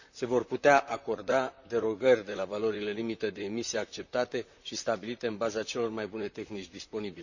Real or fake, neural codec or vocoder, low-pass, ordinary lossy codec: fake; vocoder, 44.1 kHz, 128 mel bands, Pupu-Vocoder; 7.2 kHz; none